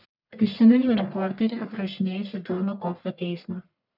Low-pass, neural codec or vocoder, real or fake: 5.4 kHz; codec, 44.1 kHz, 1.7 kbps, Pupu-Codec; fake